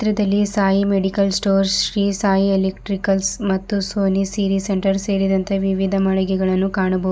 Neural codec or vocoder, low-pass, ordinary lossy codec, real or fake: none; none; none; real